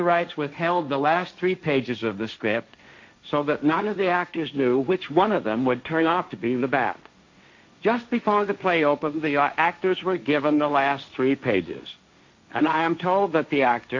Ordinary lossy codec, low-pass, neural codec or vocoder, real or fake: MP3, 48 kbps; 7.2 kHz; codec, 16 kHz, 1.1 kbps, Voila-Tokenizer; fake